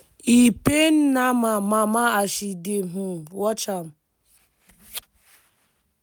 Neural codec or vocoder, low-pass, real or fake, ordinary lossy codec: none; none; real; none